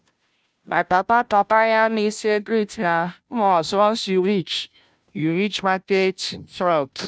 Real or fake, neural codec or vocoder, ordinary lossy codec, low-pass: fake; codec, 16 kHz, 0.5 kbps, FunCodec, trained on Chinese and English, 25 frames a second; none; none